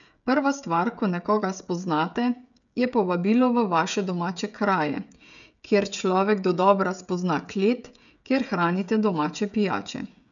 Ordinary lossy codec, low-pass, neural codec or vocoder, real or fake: none; 7.2 kHz; codec, 16 kHz, 16 kbps, FreqCodec, smaller model; fake